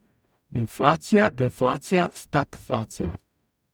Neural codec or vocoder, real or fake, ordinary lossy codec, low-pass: codec, 44.1 kHz, 0.9 kbps, DAC; fake; none; none